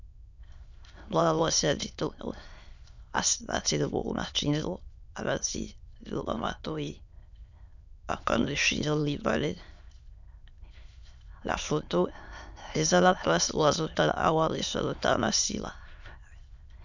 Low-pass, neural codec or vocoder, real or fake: 7.2 kHz; autoencoder, 22.05 kHz, a latent of 192 numbers a frame, VITS, trained on many speakers; fake